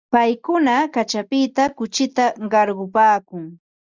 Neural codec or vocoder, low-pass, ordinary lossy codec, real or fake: none; 7.2 kHz; Opus, 64 kbps; real